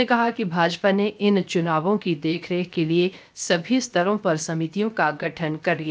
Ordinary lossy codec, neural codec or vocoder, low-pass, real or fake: none; codec, 16 kHz, 0.7 kbps, FocalCodec; none; fake